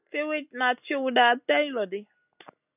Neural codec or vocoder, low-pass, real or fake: none; 3.6 kHz; real